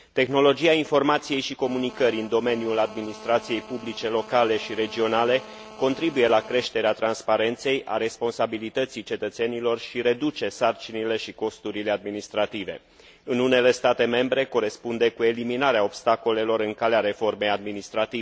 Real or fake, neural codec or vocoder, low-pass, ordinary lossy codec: real; none; none; none